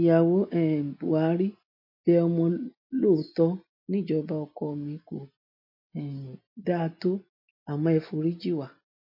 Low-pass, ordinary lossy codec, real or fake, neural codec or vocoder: 5.4 kHz; MP3, 32 kbps; real; none